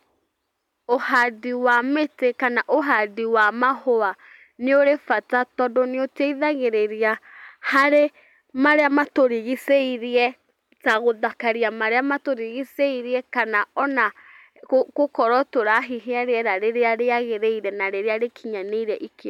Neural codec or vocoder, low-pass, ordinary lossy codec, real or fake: none; 19.8 kHz; none; real